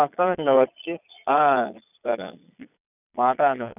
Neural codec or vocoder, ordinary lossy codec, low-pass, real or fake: vocoder, 22.05 kHz, 80 mel bands, Vocos; none; 3.6 kHz; fake